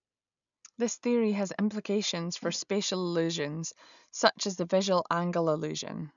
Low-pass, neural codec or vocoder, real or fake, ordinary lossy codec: 7.2 kHz; none; real; none